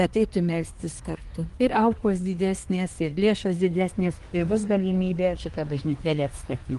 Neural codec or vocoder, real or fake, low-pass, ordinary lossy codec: codec, 24 kHz, 1 kbps, SNAC; fake; 10.8 kHz; Opus, 24 kbps